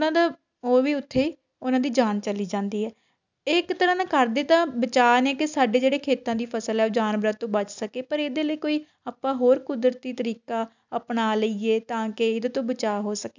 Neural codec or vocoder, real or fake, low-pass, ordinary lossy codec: none; real; 7.2 kHz; none